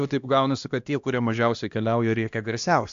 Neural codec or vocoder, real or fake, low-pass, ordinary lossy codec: codec, 16 kHz, 1 kbps, X-Codec, HuBERT features, trained on LibriSpeech; fake; 7.2 kHz; AAC, 64 kbps